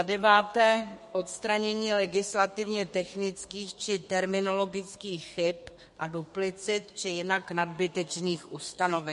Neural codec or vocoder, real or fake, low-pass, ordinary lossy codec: codec, 32 kHz, 1.9 kbps, SNAC; fake; 14.4 kHz; MP3, 48 kbps